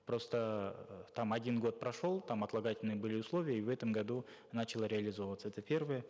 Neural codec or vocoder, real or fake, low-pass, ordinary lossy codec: none; real; none; none